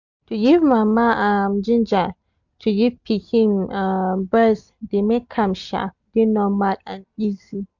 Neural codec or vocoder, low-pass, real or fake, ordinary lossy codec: none; 7.2 kHz; real; none